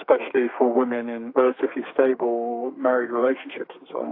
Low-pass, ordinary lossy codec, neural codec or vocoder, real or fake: 5.4 kHz; AAC, 32 kbps; codec, 32 kHz, 1.9 kbps, SNAC; fake